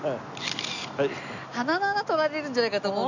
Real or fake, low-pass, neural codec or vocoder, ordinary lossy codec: real; 7.2 kHz; none; none